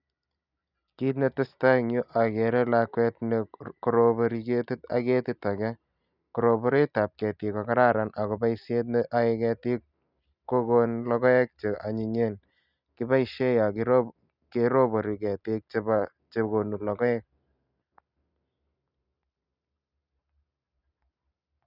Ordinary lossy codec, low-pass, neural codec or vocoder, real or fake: none; 5.4 kHz; none; real